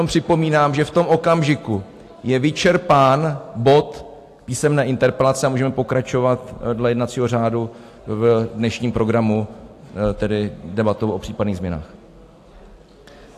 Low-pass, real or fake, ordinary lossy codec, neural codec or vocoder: 14.4 kHz; real; AAC, 64 kbps; none